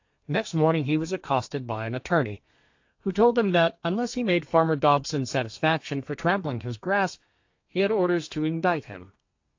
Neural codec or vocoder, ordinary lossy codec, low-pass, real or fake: codec, 32 kHz, 1.9 kbps, SNAC; AAC, 48 kbps; 7.2 kHz; fake